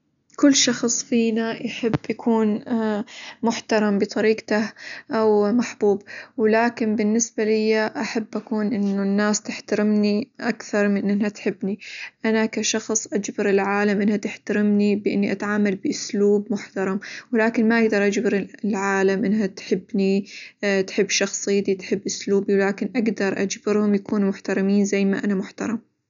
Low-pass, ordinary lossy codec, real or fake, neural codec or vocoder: 7.2 kHz; none; real; none